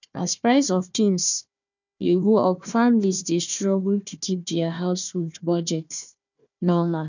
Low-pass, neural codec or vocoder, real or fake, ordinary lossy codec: 7.2 kHz; codec, 16 kHz, 1 kbps, FunCodec, trained on Chinese and English, 50 frames a second; fake; none